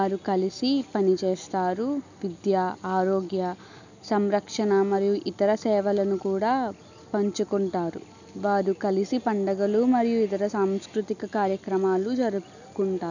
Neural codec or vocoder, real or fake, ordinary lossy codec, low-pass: none; real; none; 7.2 kHz